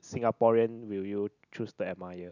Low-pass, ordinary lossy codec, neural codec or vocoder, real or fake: 7.2 kHz; none; none; real